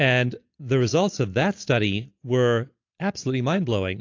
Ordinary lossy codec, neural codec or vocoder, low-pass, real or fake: AAC, 48 kbps; none; 7.2 kHz; real